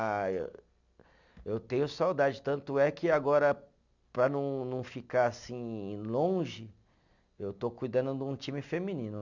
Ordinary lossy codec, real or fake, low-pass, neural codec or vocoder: none; real; 7.2 kHz; none